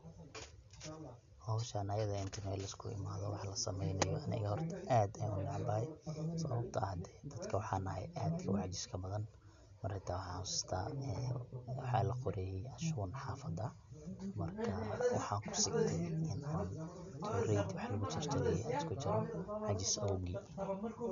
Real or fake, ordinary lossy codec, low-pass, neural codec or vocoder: real; none; 7.2 kHz; none